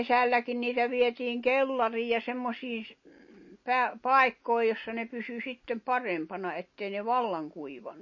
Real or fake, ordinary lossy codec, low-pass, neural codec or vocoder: real; MP3, 32 kbps; 7.2 kHz; none